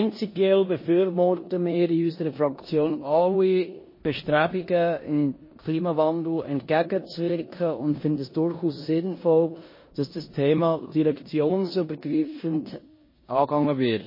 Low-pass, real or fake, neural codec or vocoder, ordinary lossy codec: 5.4 kHz; fake; codec, 16 kHz in and 24 kHz out, 0.9 kbps, LongCat-Audio-Codec, four codebook decoder; MP3, 24 kbps